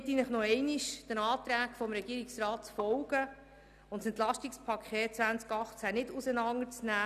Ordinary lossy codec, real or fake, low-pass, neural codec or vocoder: none; real; 14.4 kHz; none